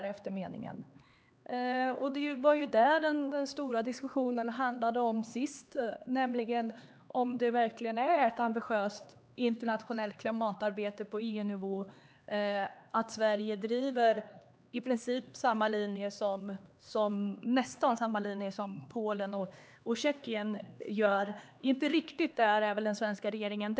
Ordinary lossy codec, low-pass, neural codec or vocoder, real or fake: none; none; codec, 16 kHz, 2 kbps, X-Codec, HuBERT features, trained on LibriSpeech; fake